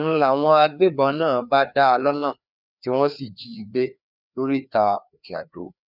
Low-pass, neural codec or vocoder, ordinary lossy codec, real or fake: 5.4 kHz; codec, 16 kHz, 2 kbps, FreqCodec, larger model; none; fake